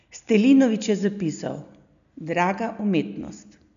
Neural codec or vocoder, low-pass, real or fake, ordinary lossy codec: none; 7.2 kHz; real; none